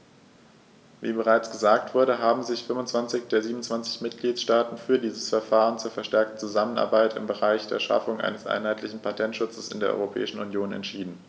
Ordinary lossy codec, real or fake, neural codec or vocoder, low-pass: none; real; none; none